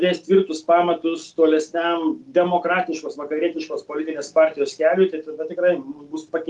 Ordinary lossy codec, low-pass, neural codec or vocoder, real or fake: Opus, 32 kbps; 10.8 kHz; none; real